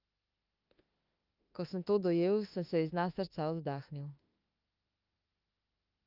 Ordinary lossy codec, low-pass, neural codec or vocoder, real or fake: Opus, 32 kbps; 5.4 kHz; autoencoder, 48 kHz, 32 numbers a frame, DAC-VAE, trained on Japanese speech; fake